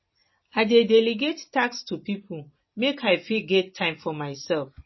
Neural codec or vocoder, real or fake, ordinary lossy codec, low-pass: none; real; MP3, 24 kbps; 7.2 kHz